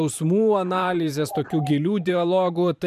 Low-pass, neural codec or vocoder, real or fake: 14.4 kHz; vocoder, 44.1 kHz, 128 mel bands every 512 samples, BigVGAN v2; fake